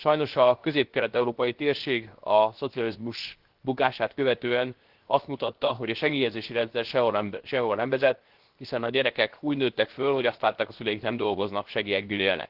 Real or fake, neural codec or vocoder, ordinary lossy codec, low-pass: fake; codec, 16 kHz, 0.7 kbps, FocalCodec; Opus, 16 kbps; 5.4 kHz